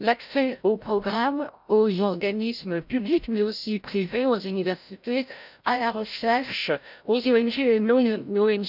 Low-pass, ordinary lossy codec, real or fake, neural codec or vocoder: 5.4 kHz; none; fake; codec, 16 kHz, 0.5 kbps, FreqCodec, larger model